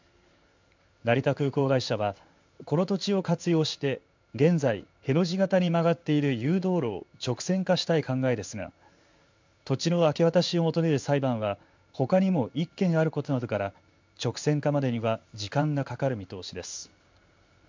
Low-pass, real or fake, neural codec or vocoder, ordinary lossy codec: 7.2 kHz; fake; codec, 16 kHz in and 24 kHz out, 1 kbps, XY-Tokenizer; MP3, 64 kbps